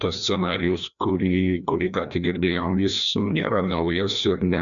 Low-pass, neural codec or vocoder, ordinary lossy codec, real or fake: 7.2 kHz; codec, 16 kHz, 1 kbps, FreqCodec, larger model; MP3, 96 kbps; fake